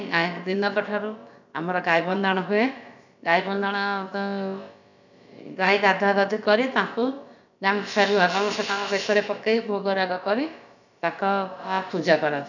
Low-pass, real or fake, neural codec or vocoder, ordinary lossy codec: 7.2 kHz; fake; codec, 16 kHz, about 1 kbps, DyCAST, with the encoder's durations; none